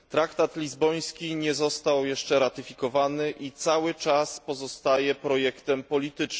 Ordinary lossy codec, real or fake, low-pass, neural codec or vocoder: none; real; none; none